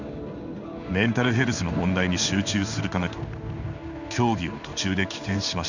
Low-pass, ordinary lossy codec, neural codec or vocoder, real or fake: 7.2 kHz; none; codec, 16 kHz in and 24 kHz out, 1 kbps, XY-Tokenizer; fake